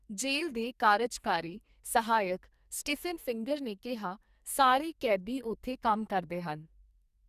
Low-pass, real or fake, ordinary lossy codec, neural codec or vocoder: 14.4 kHz; fake; Opus, 64 kbps; codec, 44.1 kHz, 2.6 kbps, SNAC